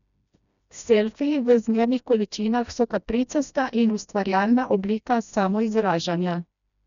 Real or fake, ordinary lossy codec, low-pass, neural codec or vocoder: fake; none; 7.2 kHz; codec, 16 kHz, 1 kbps, FreqCodec, smaller model